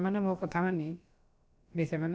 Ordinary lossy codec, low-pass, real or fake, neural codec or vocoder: none; none; fake; codec, 16 kHz, about 1 kbps, DyCAST, with the encoder's durations